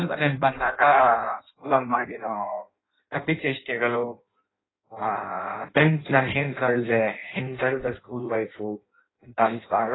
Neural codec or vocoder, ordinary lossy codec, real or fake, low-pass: codec, 16 kHz in and 24 kHz out, 0.6 kbps, FireRedTTS-2 codec; AAC, 16 kbps; fake; 7.2 kHz